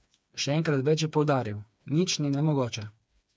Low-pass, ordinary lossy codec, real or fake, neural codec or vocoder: none; none; fake; codec, 16 kHz, 4 kbps, FreqCodec, smaller model